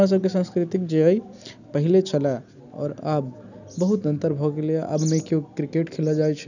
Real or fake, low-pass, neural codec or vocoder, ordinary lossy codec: real; 7.2 kHz; none; none